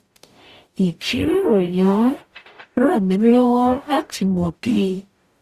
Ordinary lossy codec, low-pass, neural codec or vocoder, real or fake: Opus, 64 kbps; 14.4 kHz; codec, 44.1 kHz, 0.9 kbps, DAC; fake